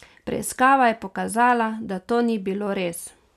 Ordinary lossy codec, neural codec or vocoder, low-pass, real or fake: none; none; 14.4 kHz; real